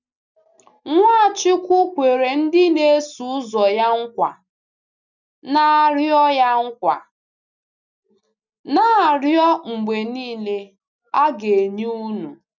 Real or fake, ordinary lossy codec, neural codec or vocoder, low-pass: real; none; none; 7.2 kHz